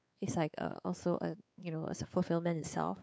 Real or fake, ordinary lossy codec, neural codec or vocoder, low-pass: fake; none; codec, 16 kHz, 4 kbps, X-Codec, WavLM features, trained on Multilingual LibriSpeech; none